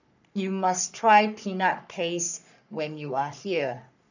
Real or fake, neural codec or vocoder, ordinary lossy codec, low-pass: fake; codec, 44.1 kHz, 3.4 kbps, Pupu-Codec; none; 7.2 kHz